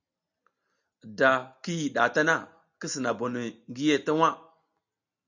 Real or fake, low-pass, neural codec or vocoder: real; 7.2 kHz; none